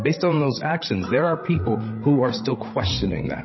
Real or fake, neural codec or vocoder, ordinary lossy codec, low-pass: fake; codec, 16 kHz, 16 kbps, FreqCodec, larger model; MP3, 24 kbps; 7.2 kHz